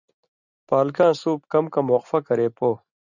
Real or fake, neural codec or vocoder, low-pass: real; none; 7.2 kHz